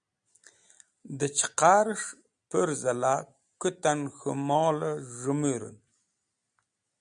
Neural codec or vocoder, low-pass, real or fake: none; 9.9 kHz; real